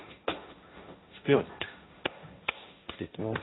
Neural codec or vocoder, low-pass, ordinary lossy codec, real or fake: codec, 16 kHz, 1.1 kbps, Voila-Tokenizer; 7.2 kHz; AAC, 16 kbps; fake